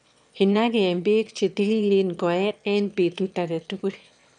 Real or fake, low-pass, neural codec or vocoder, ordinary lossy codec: fake; 9.9 kHz; autoencoder, 22.05 kHz, a latent of 192 numbers a frame, VITS, trained on one speaker; none